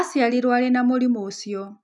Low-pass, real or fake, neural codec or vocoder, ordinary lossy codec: 10.8 kHz; real; none; none